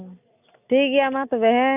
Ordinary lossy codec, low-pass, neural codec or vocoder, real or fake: none; 3.6 kHz; none; real